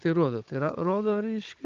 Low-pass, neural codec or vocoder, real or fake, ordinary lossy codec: 7.2 kHz; codec, 16 kHz, 16 kbps, FunCodec, trained on Chinese and English, 50 frames a second; fake; Opus, 24 kbps